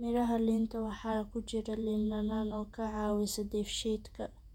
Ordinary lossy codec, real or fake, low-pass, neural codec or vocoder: none; fake; 19.8 kHz; vocoder, 48 kHz, 128 mel bands, Vocos